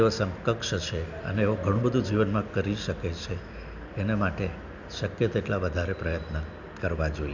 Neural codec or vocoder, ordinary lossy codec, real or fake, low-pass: none; none; real; 7.2 kHz